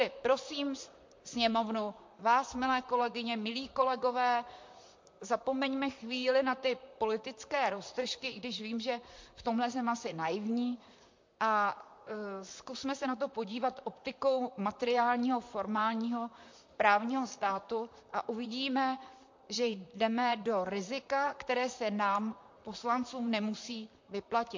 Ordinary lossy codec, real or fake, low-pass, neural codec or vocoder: MP3, 48 kbps; fake; 7.2 kHz; vocoder, 44.1 kHz, 128 mel bands, Pupu-Vocoder